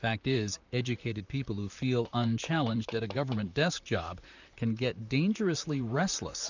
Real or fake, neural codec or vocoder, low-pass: fake; vocoder, 44.1 kHz, 128 mel bands, Pupu-Vocoder; 7.2 kHz